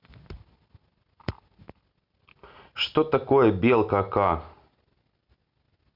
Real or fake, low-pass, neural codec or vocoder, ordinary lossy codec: real; 5.4 kHz; none; Opus, 64 kbps